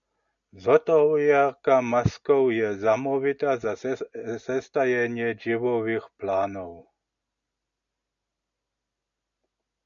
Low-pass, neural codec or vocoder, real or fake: 7.2 kHz; none; real